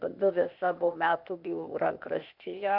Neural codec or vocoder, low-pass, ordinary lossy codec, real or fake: codec, 16 kHz, 0.8 kbps, ZipCodec; 5.4 kHz; MP3, 48 kbps; fake